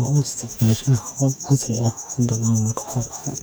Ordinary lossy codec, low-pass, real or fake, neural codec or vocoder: none; none; fake; codec, 44.1 kHz, 2.6 kbps, DAC